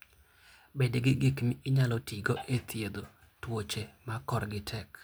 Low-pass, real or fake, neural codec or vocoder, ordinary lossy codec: none; real; none; none